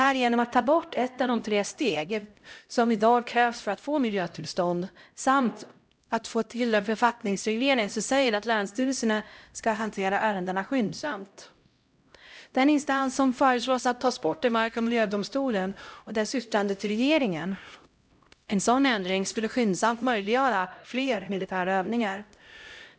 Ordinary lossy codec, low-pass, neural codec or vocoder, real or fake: none; none; codec, 16 kHz, 0.5 kbps, X-Codec, HuBERT features, trained on LibriSpeech; fake